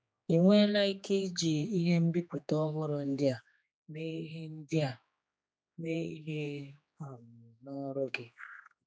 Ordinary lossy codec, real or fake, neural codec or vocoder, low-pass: none; fake; codec, 16 kHz, 2 kbps, X-Codec, HuBERT features, trained on general audio; none